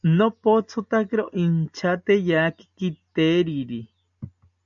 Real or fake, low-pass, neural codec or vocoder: real; 7.2 kHz; none